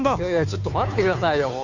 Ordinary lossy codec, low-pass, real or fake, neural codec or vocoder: none; 7.2 kHz; fake; codec, 16 kHz, 2 kbps, FunCodec, trained on Chinese and English, 25 frames a second